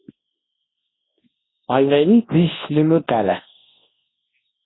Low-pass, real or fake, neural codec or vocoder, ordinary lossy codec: 7.2 kHz; fake; codec, 24 kHz, 0.9 kbps, WavTokenizer, large speech release; AAC, 16 kbps